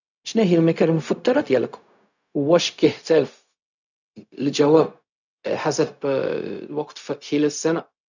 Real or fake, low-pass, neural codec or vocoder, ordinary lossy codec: fake; 7.2 kHz; codec, 16 kHz, 0.4 kbps, LongCat-Audio-Codec; none